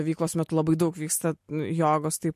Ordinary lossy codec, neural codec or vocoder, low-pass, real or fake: MP3, 64 kbps; none; 14.4 kHz; real